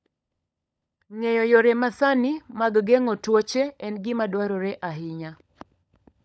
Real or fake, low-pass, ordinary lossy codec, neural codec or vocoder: fake; none; none; codec, 16 kHz, 16 kbps, FunCodec, trained on LibriTTS, 50 frames a second